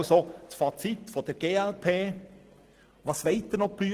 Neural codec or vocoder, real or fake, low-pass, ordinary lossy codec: none; real; 14.4 kHz; Opus, 16 kbps